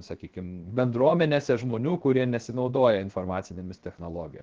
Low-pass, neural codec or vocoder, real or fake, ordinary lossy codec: 7.2 kHz; codec, 16 kHz, 0.7 kbps, FocalCodec; fake; Opus, 16 kbps